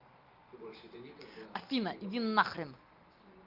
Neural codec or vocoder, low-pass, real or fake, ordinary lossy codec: none; 5.4 kHz; real; Opus, 32 kbps